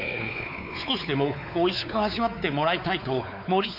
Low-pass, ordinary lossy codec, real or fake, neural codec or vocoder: 5.4 kHz; none; fake; codec, 16 kHz, 4 kbps, X-Codec, WavLM features, trained on Multilingual LibriSpeech